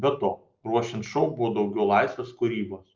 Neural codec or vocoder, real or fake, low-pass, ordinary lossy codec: none; real; 7.2 kHz; Opus, 24 kbps